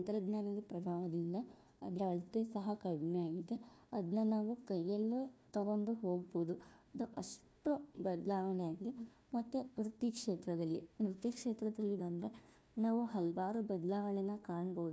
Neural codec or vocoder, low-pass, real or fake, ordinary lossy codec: codec, 16 kHz, 1 kbps, FunCodec, trained on Chinese and English, 50 frames a second; none; fake; none